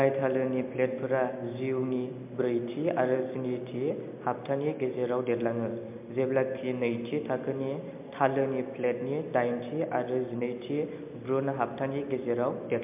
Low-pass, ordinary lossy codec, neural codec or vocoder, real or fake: 3.6 kHz; MP3, 32 kbps; none; real